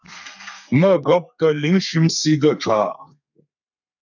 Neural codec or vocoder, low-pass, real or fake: codec, 32 kHz, 1.9 kbps, SNAC; 7.2 kHz; fake